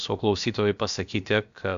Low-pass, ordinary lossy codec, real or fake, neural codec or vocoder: 7.2 kHz; MP3, 64 kbps; fake; codec, 16 kHz, about 1 kbps, DyCAST, with the encoder's durations